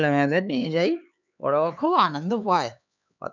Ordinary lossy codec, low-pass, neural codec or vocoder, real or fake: none; 7.2 kHz; codec, 16 kHz, 4 kbps, X-Codec, HuBERT features, trained on LibriSpeech; fake